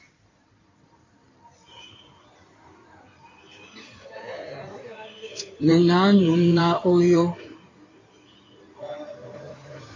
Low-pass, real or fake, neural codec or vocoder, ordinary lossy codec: 7.2 kHz; fake; codec, 16 kHz in and 24 kHz out, 2.2 kbps, FireRedTTS-2 codec; AAC, 32 kbps